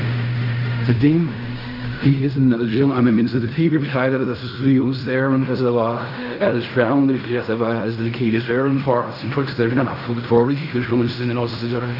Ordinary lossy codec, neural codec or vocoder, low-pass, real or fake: none; codec, 16 kHz in and 24 kHz out, 0.4 kbps, LongCat-Audio-Codec, fine tuned four codebook decoder; 5.4 kHz; fake